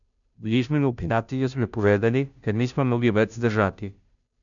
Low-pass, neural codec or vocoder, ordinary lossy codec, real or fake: 7.2 kHz; codec, 16 kHz, 0.5 kbps, FunCodec, trained on Chinese and English, 25 frames a second; none; fake